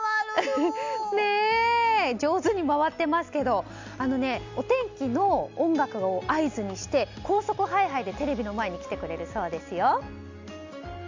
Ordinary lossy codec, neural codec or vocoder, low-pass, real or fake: MP3, 64 kbps; none; 7.2 kHz; real